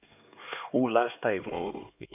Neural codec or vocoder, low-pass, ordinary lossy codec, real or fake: codec, 16 kHz, 2 kbps, X-Codec, HuBERT features, trained on LibriSpeech; 3.6 kHz; none; fake